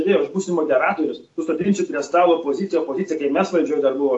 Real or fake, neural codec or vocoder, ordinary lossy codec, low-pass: real; none; AAC, 48 kbps; 10.8 kHz